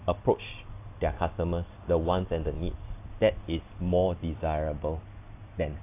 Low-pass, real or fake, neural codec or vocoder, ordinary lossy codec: 3.6 kHz; real; none; AAC, 24 kbps